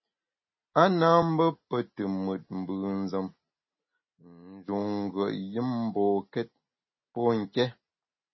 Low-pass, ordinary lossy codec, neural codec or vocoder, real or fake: 7.2 kHz; MP3, 24 kbps; none; real